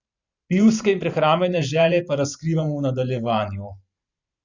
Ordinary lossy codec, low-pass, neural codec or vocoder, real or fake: Opus, 64 kbps; 7.2 kHz; vocoder, 44.1 kHz, 128 mel bands every 512 samples, BigVGAN v2; fake